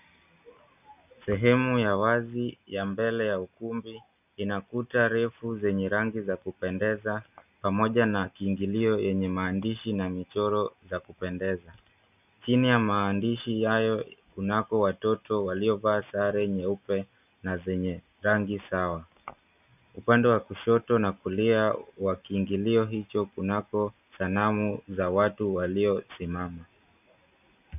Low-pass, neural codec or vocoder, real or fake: 3.6 kHz; none; real